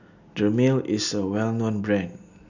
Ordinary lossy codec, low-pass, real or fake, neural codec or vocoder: none; 7.2 kHz; real; none